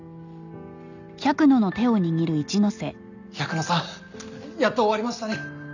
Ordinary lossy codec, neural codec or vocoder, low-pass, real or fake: none; none; 7.2 kHz; real